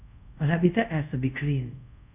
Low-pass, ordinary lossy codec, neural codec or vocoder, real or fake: 3.6 kHz; none; codec, 24 kHz, 0.5 kbps, DualCodec; fake